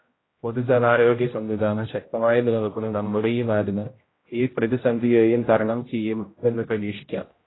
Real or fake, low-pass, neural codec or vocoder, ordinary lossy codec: fake; 7.2 kHz; codec, 16 kHz, 0.5 kbps, X-Codec, HuBERT features, trained on general audio; AAC, 16 kbps